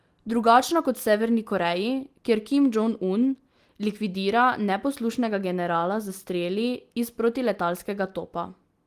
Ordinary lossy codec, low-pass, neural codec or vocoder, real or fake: Opus, 24 kbps; 14.4 kHz; none; real